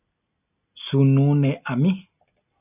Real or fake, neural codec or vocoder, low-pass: real; none; 3.6 kHz